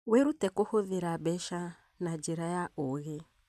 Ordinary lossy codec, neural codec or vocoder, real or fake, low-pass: none; vocoder, 44.1 kHz, 128 mel bands every 256 samples, BigVGAN v2; fake; 14.4 kHz